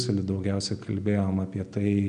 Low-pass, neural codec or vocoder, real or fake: 9.9 kHz; none; real